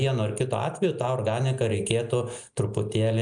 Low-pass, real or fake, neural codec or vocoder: 9.9 kHz; real; none